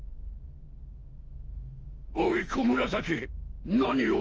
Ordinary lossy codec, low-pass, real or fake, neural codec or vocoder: Opus, 24 kbps; 7.2 kHz; fake; autoencoder, 48 kHz, 32 numbers a frame, DAC-VAE, trained on Japanese speech